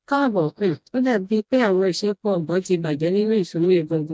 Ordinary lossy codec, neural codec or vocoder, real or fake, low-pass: none; codec, 16 kHz, 1 kbps, FreqCodec, smaller model; fake; none